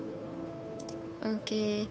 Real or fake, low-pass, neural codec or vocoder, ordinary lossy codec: fake; none; codec, 16 kHz, 2 kbps, FunCodec, trained on Chinese and English, 25 frames a second; none